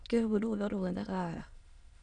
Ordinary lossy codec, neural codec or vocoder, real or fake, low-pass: none; autoencoder, 22.05 kHz, a latent of 192 numbers a frame, VITS, trained on many speakers; fake; 9.9 kHz